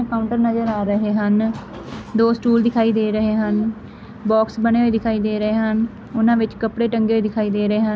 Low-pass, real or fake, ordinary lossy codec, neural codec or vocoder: none; real; none; none